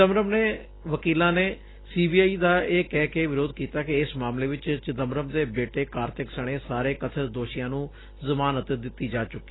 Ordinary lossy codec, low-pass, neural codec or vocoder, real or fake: AAC, 16 kbps; 7.2 kHz; none; real